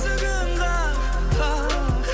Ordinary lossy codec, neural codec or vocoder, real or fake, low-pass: none; none; real; none